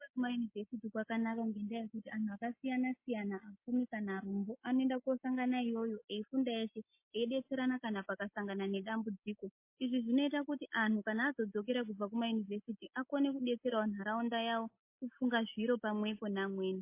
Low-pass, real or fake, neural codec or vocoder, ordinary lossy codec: 3.6 kHz; real; none; MP3, 24 kbps